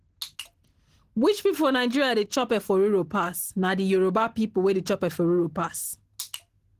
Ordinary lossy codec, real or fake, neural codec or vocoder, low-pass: Opus, 16 kbps; real; none; 14.4 kHz